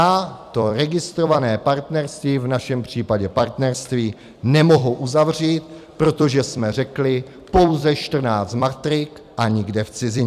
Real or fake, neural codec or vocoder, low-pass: fake; vocoder, 44.1 kHz, 128 mel bands every 256 samples, BigVGAN v2; 14.4 kHz